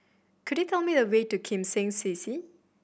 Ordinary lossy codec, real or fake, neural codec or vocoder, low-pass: none; real; none; none